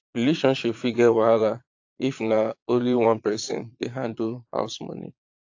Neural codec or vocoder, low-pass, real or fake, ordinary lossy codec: vocoder, 22.05 kHz, 80 mel bands, Vocos; 7.2 kHz; fake; AAC, 48 kbps